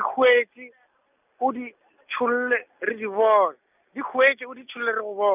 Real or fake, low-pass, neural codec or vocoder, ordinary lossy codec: real; 3.6 kHz; none; none